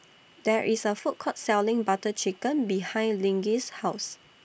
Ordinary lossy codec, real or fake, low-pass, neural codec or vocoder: none; real; none; none